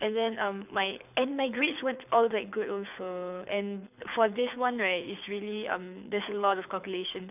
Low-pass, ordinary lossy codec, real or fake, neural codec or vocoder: 3.6 kHz; none; fake; codec, 24 kHz, 6 kbps, HILCodec